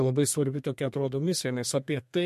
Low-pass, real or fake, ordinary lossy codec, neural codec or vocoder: 14.4 kHz; fake; MP3, 64 kbps; codec, 32 kHz, 1.9 kbps, SNAC